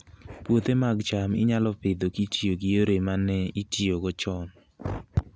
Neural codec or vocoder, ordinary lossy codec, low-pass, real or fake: none; none; none; real